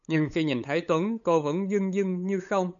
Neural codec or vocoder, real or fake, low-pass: codec, 16 kHz, 8 kbps, FunCodec, trained on LibriTTS, 25 frames a second; fake; 7.2 kHz